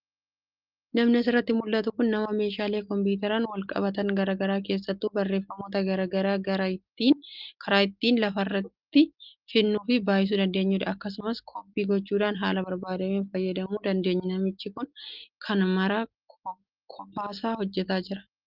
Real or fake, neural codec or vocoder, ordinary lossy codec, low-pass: real; none; Opus, 32 kbps; 5.4 kHz